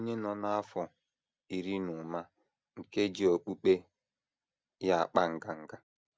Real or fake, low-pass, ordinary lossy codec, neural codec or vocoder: real; none; none; none